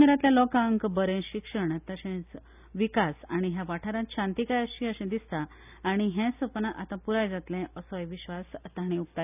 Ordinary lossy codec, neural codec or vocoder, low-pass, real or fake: none; none; 3.6 kHz; real